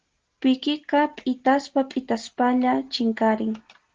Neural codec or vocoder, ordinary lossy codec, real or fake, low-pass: none; Opus, 16 kbps; real; 7.2 kHz